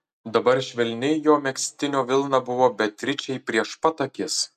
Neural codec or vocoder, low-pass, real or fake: none; 14.4 kHz; real